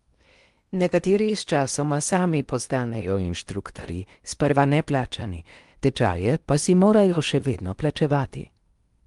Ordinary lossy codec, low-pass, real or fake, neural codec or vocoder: Opus, 32 kbps; 10.8 kHz; fake; codec, 16 kHz in and 24 kHz out, 0.8 kbps, FocalCodec, streaming, 65536 codes